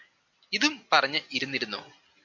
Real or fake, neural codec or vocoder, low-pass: real; none; 7.2 kHz